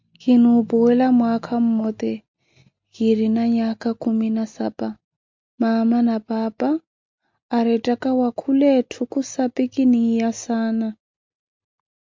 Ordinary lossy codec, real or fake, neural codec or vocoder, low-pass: MP3, 64 kbps; real; none; 7.2 kHz